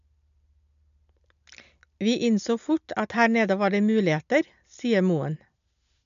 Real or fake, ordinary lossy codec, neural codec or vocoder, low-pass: real; none; none; 7.2 kHz